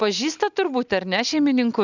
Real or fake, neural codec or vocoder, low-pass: real; none; 7.2 kHz